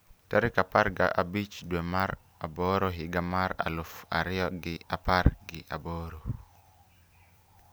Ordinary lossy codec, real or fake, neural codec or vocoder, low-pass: none; real; none; none